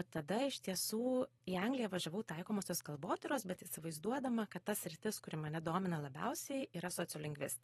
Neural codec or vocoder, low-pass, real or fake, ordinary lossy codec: vocoder, 44.1 kHz, 128 mel bands every 512 samples, BigVGAN v2; 19.8 kHz; fake; AAC, 32 kbps